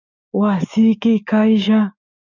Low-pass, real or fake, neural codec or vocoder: 7.2 kHz; fake; codec, 16 kHz, 6 kbps, DAC